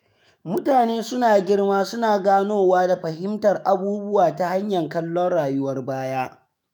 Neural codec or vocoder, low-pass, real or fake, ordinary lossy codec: autoencoder, 48 kHz, 128 numbers a frame, DAC-VAE, trained on Japanese speech; none; fake; none